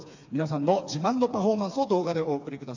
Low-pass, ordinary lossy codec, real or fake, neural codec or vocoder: 7.2 kHz; AAC, 48 kbps; fake; codec, 16 kHz, 4 kbps, FreqCodec, smaller model